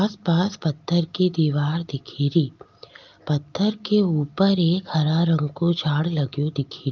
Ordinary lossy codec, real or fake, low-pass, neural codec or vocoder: Opus, 32 kbps; real; 7.2 kHz; none